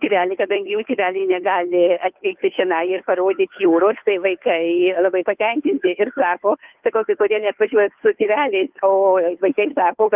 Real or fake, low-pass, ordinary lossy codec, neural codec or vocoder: fake; 3.6 kHz; Opus, 32 kbps; codec, 16 kHz, 2 kbps, FunCodec, trained on Chinese and English, 25 frames a second